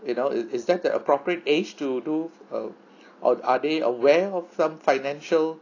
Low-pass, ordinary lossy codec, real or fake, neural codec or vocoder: 7.2 kHz; AAC, 32 kbps; real; none